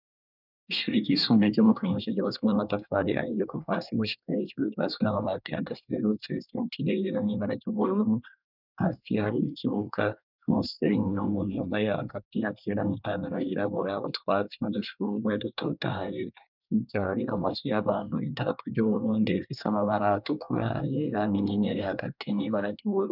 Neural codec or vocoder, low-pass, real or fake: codec, 24 kHz, 1 kbps, SNAC; 5.4 kHz; fake